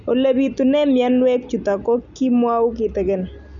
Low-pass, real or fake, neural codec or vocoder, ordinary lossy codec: 7.2 kHz; real; none; none